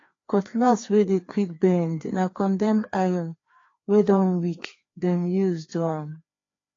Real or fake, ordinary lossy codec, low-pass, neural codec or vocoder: fake; AAC, 32 kbps; 7.2 kHz; codec, 16 kHz, 2 kbps, FreqCodec, larger model